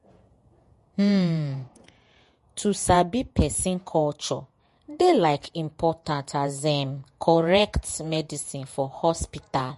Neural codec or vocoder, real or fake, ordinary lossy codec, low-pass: vocoder, 48 kHz, 128 mel bands, Vocos; fake; MP3, 48 kbps; 14.4 kHz